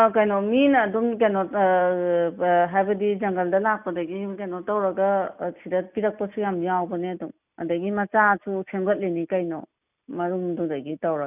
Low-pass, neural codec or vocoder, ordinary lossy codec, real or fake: 3.6 kHz; none; none; real